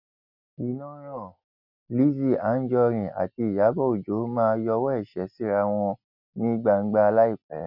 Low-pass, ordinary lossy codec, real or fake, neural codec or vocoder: 5.4 kHz; none; real; none